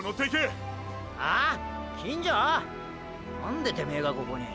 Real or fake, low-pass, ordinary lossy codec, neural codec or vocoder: real; none; none; none